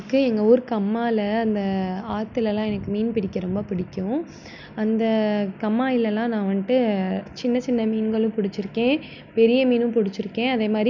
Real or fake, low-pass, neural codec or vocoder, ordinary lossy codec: real; 7.2 kHz; none; none